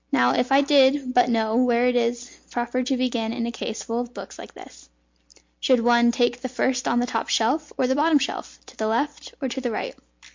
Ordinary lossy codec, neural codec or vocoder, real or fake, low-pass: MP3, 48 kbps; none; real; 7.2 kHz